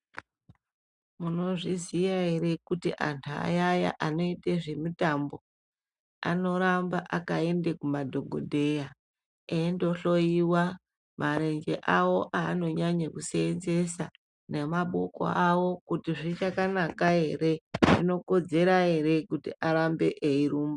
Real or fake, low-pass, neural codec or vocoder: real; 10.8 kHz; none